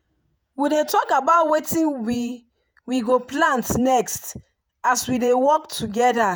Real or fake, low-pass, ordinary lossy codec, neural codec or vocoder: fake; none; none; vocoder, 48 kHz, 128 mel bands, Vocos